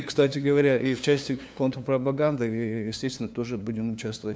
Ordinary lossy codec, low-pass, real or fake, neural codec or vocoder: none; none; fake; codec, 16 kHz, 2 kbps, FunCodec, trained on LibriTTS, 25 frames a second